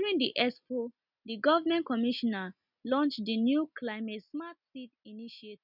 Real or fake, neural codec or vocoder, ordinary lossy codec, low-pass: real; none; none; 5.4 kHz